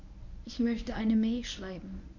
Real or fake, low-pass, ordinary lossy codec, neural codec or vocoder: fake; 7.2 kHz; none; codec, 24 kHz, 0.9 kbps, WavTokenizer, medium speech release version 1